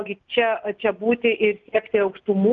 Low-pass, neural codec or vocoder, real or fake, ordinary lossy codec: 7.2 kHz; none; real; Opus, 32 kbps